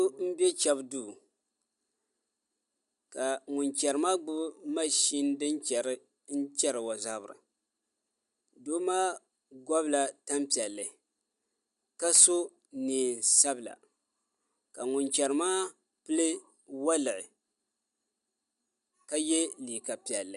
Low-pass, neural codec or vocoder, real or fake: 10.8 kHz; none; real